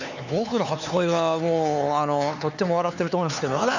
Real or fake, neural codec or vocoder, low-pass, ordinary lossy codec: fake; codec, 16 kHz, 4 kbps, X-Codec, HuBERT features, trained on LibriSpeech; 7.2 kHz; none